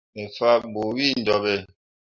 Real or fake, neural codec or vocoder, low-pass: real; none; 7.2 kHz